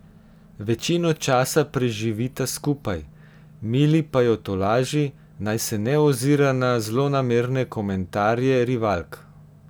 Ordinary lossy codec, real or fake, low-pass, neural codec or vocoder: none; real; none; none